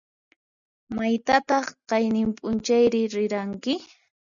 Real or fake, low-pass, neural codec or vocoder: real; 7.2 kHz; none